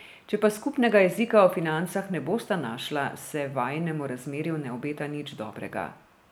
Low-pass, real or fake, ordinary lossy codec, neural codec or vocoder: none; real; none; none